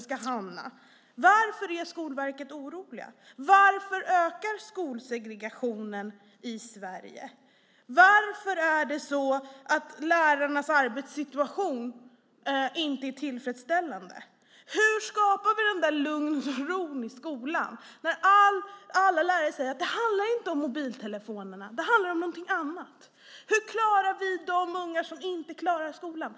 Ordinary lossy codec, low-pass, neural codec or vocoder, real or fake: none; none; none; real